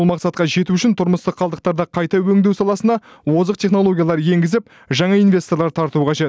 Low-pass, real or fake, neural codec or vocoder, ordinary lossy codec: none; real; none; none